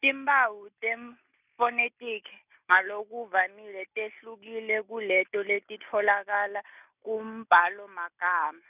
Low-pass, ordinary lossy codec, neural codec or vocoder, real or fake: 3.6 kHz; none; none; real